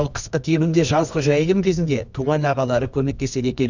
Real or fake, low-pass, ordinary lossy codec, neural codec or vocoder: fake; 7.2 kHz; none; codec, 24 kHz, 0.9 kbps, WavTokenizer, medium music audio release